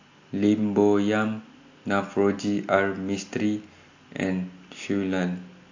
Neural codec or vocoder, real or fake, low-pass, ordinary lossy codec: none; real; 7.2 kHz; AAC, 48 kbps